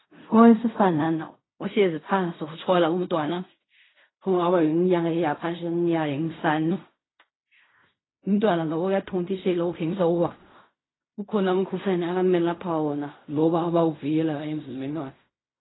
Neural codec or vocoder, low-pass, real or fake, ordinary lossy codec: codec, 16 kHz in and 24 kHz out, 0.4 kbps, LongCat-Audio-Codec, fine tuned four codebook decoder; 7.2 kHz; fake; AAC, 16 kbps